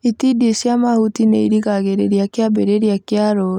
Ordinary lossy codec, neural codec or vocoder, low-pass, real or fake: none; none; 19.8 kHz; real